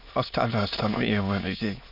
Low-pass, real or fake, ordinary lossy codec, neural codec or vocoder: 5.4 kHz; fake; none; autoencoder, 22.05 kHz, a latent of 192 numbers a frame, VITS, trained on many speakers